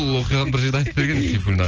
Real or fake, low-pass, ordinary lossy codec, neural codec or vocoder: real; 7.2 kHz; Opus, 16 kbps; none